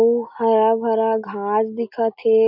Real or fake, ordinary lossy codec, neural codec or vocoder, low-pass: real; MP3, 48 kbps; none; 5.4 kHz